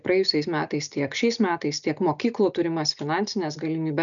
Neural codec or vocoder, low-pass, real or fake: none; 7.2 kHz; real